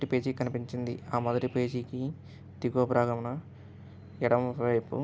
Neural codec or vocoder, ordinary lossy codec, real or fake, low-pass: none; none; real; none